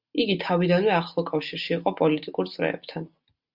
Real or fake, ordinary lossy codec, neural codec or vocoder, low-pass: real; Opus, 64 kbps; none; 5.4 kHz